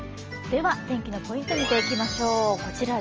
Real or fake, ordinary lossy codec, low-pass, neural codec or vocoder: real; Opus, 24 kbps; 7.2 kHz; none